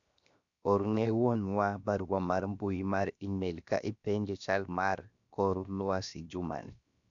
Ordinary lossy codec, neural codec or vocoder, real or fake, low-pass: none; codec, 16 kHz, 0.7 kbps, FocalCodec; fake; 7.2 kHz